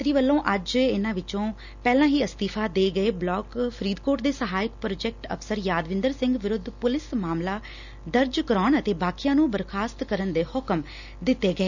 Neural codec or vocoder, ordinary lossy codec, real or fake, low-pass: none; none; real; 7.2 kHz